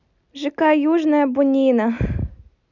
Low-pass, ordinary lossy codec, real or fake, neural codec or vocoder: 7.2 kHz; none; real; none